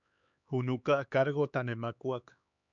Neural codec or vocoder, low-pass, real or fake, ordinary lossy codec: codec, 16 kHz, 4 kbps, X-Codec, HuBERT features, trained on LibriSpeech; 7.2 kHz; fake; MP3, 96 kbps